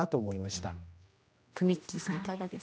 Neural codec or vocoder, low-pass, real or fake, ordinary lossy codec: codec, 16 kHz, 1 kbps, X-Codec, HuBERT features, trained on general audio; none; fake; none